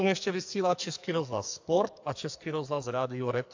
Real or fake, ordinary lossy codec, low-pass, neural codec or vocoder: fake; AAC, 48 kbps; 7.2 kHz; codec, 44.1 kHz, 2.6 kbps, SNAC